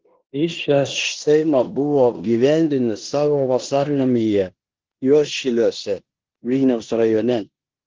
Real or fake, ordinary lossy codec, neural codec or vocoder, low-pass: fake; Opus, 16 kbps; codec, 16 kHz in and 24 kHz out, 0.9 kbps, LongCat-Audio-Codec, four codebook decoder; 7.2 kHz